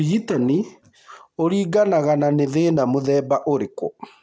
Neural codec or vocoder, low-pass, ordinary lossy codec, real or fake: none; none; none; real